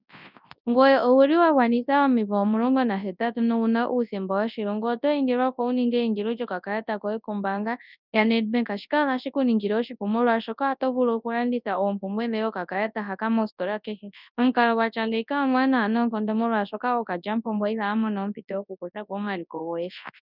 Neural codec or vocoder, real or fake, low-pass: codec, 24 kHz, 0.9 kbps, WavTokenizer, large speech release; fake; 5.4 kHz